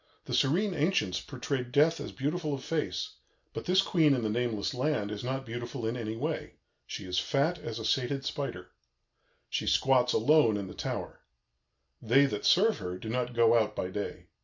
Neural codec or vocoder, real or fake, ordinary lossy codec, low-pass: none; real; MP3, 48 kbps; 7.2 kHz